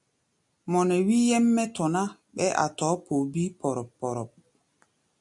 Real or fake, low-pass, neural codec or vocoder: real; 10.8 kHz; none